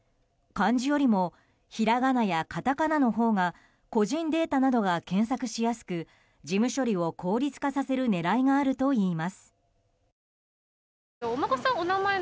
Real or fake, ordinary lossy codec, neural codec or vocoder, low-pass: real; none; none; none